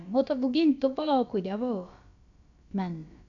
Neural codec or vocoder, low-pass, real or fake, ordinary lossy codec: codec, 16 kHz, about 1 kbps, DyCAST, with the encoder's durations; 7.2 kHz; fake; none